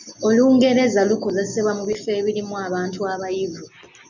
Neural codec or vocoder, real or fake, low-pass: none; real; 7.2 kHz